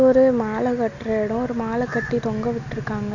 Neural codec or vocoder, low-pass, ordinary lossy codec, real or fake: none; 7.2 kHz; none; real